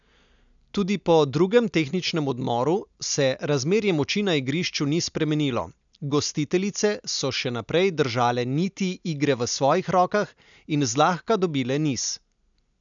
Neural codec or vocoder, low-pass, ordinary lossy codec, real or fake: none; 7.2 kHz; none; real